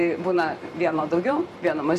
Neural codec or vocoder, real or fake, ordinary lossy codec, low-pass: vocoder, 44.1 kHz, 128 mel bands every 512 samples, BigVGAN v2; fake; MP3, 64 kbps; 14.4 kHz